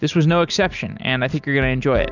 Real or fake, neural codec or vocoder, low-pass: real; none; 7.2 kHz